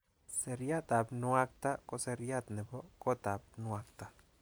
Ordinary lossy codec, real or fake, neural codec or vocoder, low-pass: none; fake; vocoder, 44.1 kHz, 128 mel bands every 256 samples, BigVGAN v2; none